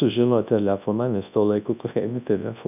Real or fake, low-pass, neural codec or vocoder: fake; 3.6 kHz; codec, 24 kHz, 0.9 kbps, WavTokenizer, large speech release